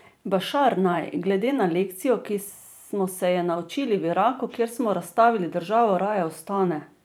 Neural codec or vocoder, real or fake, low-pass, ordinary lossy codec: vocoder, 44.1 kHz, 128 mel bands every 512 samples, BigVGAN v2; fake; none; none